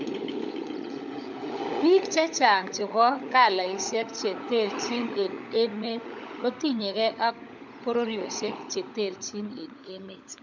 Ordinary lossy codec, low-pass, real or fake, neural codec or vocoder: none; 7.2 kHz; fake; codec, 16 kHz, 16 kbps, FunCodec, trained on LibriTTS, 50 frames a second